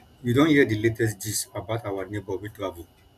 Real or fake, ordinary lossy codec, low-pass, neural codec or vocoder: real; none; 14.4 kHz; none